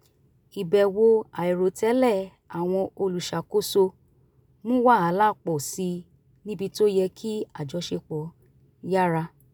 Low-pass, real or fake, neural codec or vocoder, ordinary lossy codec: none; real; none; none